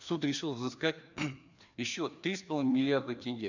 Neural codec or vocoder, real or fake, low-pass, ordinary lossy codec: codec, 16 kHz, 2 kbps, FreqCodec, larger model; fake; 7.2 kHz; none